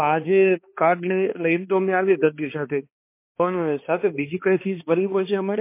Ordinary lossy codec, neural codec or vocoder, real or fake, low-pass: MP3, 24 kbps; codec, 16 kHz, 2 kbps, X-Codec, HuBERT features, trained on balanced general audio; fake; 3.6 kHz